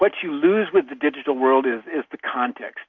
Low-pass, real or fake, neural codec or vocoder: 7.2 kHz; real; none